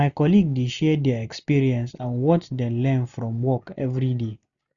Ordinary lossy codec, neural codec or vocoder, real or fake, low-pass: none; none; real; 7.2 kHz